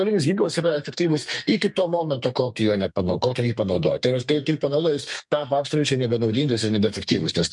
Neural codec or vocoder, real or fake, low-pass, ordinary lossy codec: codec, 32 kHz, 1.9 kbps, SNAC; fake; 10.8 kHz; MP3, 64 kbps